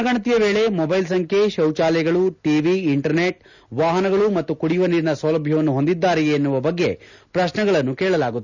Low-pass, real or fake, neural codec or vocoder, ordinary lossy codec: 7.2 kHz; real; none; none